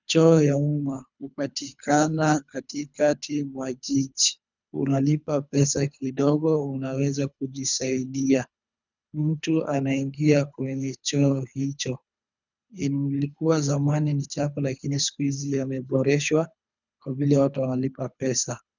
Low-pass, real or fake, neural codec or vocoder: 7.2 kHz; fake; codec, 24 kHz, 3 kbps, HILCodec